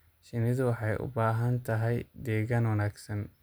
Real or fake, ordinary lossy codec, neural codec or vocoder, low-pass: real; none; none; none